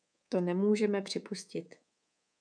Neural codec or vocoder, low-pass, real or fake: codec, 24 kHz, 3.1 kbps, DualCodec; 9.9 kHz; fake